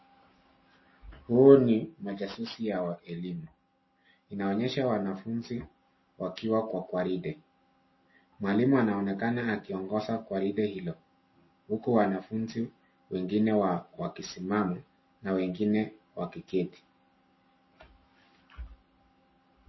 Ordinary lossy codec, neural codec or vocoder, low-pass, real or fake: MP3, 24 kbps; none; 7.2 kHz; real